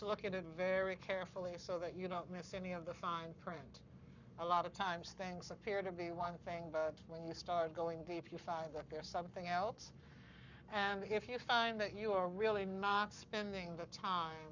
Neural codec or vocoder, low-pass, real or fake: codec, 44.1 kHz, 7.8 kbps, Pupu-Codec; 7.2 kHz; fake